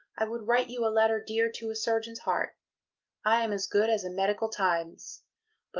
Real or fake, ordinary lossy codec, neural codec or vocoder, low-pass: real; Opus, 32 kbps; none; 7.2 kHz